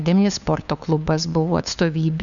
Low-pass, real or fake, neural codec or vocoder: 7.2 kHz; fake; codec, 16 kHz, 2 kbps, FunCodec, trained on LibriTTS, 25 frames a second